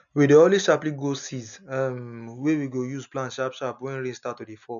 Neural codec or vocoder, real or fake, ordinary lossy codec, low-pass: none; real; none; 7.2 kHz